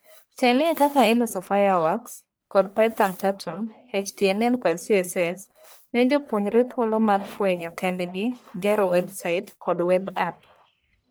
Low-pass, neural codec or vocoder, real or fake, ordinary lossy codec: none; codec, 44.1 kHz, 1.7 kbps, Pupu-Codec; fake; none